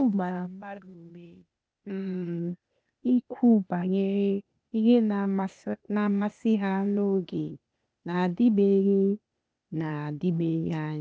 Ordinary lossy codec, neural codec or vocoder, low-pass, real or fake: none; codec, 16 kHz, 0.8 kbps, ZipCodec; none; fake